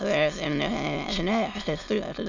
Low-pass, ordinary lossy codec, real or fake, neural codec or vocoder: 7.2 kHz; none; fake; autoencoder, 22.05 kHz, a latent of 192 numbers a frame, VITS, trained on many speakers